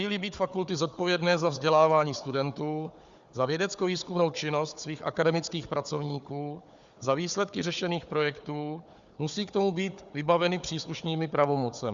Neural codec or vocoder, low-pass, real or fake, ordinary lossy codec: codec, 16 kHz, 4 kbps, FunCodec, trained on Chinese and English, 50 frames a second; 7.2 kHz; fake; Opus, 64 kbps